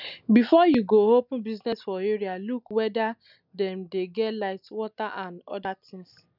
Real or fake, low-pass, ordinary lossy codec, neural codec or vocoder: real; 5.4 kHz; none; none